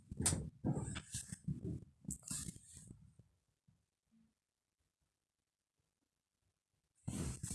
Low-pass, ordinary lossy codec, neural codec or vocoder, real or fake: none; none; none; real